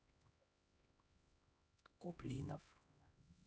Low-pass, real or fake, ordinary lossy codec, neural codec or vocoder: none; fake; none; codec, 16 kHz, 1 kbps, X-Codec, HuBERT features, trained on LibriSpeech